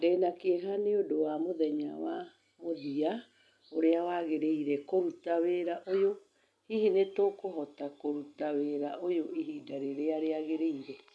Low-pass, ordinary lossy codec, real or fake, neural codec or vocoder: none; none; real; none